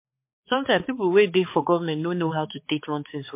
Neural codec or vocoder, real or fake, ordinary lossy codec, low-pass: codec, 16 kHz, 4 kbps, X-Codec, HuBERT features, trained on balanced general audio; fake; MP3, 24 kbps; 3.6 kHz